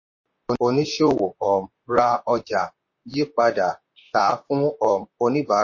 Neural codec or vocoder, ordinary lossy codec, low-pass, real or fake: vocoder, 44.1 kHz, 128 mel bands, Pupu-Vocoder; MP3, 32 kbps; 7.2 kHz; fake